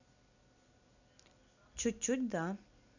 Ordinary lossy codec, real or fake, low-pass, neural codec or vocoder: none; real; 7.2 kHz; none